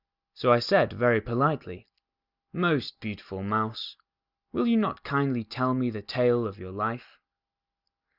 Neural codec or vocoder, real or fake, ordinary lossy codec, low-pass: none; real; Opus, 64 kbps; 5.4 kHz